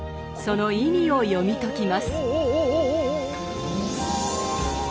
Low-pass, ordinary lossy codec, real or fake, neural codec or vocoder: none; none; real; none